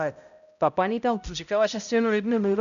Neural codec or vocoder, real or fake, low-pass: codec, 16 kHz, 0.5 kbps, X-Codec, HuBERT features, trained on balanced general audio; fake; 7.2 kHz